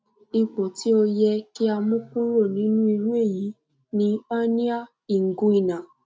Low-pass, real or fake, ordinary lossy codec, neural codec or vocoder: none; real; none; none